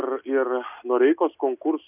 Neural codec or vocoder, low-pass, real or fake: none; 3.6 kHz; real